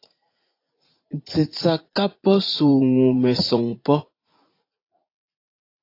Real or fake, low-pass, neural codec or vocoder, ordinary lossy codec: fake; 5.4 kHz; vocoder, 44.1 kHz, 80 mel bands, Vocos; AAC, 32 kbps